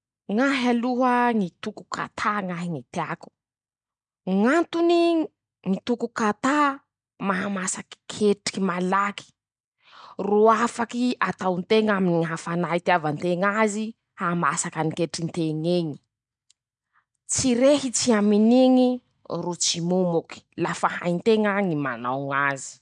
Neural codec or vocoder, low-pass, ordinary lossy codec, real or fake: none; 9.9 kHz; none; real